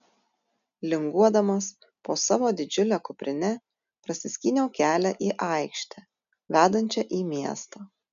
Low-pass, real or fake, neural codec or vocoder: 7.2 kHz; real; none